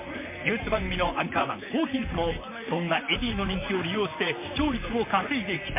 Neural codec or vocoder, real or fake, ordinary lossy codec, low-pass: vocoder, 44.1 kHz, 128 mel bands, Pupu-Vocoder; fake; MP3, 32 kbps; 3.6 kHz